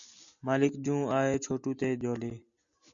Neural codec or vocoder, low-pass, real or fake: none; 7.2 kHz; real